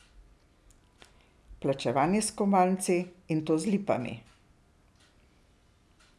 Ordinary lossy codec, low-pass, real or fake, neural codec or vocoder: none; none; real; none